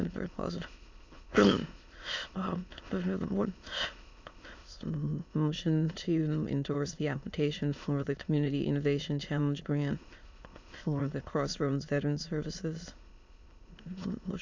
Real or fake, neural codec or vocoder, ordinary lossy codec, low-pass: fake; autoencoder, 22.05 kHz, a latent of 192 numbers a frame, VITS, trained on many speakers; MP3, 64 kbps; 7.2 kHz